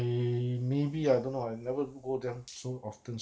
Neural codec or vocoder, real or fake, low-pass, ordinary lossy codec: none; real; none; none